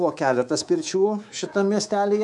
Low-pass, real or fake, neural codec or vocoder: 10.8 kHz; fake; codec, 24 kHz, 3.1 kbps, DualCodec